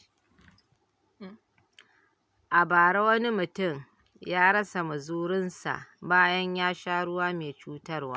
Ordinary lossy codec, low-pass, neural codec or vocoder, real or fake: none; none; none; real